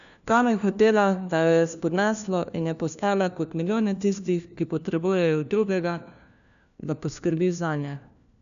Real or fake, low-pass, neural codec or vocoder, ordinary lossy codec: fake; 7.2 kHz; codec, 16 kHz, 1 kbps, FunCodec, trained on LibriTTS, 50 frames a second; none